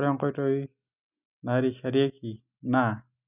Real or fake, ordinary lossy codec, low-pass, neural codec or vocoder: real; none; 3.6 kHz; none